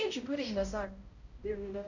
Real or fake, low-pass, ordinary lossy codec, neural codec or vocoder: fake; 7.2 kHz; Opus, 64 kbps; codec, 16 kHz, 0.5 kbps, X-Codec, HuBERT features, trained on balanced general audio